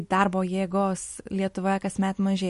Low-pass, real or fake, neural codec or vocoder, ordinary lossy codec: 14.4 kHz; real; none; MP3, 48 kbps